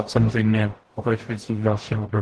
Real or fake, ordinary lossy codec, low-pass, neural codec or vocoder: fake; Opus, 16 kbps; 10.8 kHz; codec, 44.1 kHz, 0.9 kbps, DAC